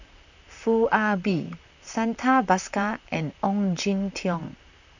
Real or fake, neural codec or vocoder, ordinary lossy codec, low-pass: fake; vocoder, 44.1 kHz, 128 mel bands, Pupu-Vocoder; none; 7.2 kHz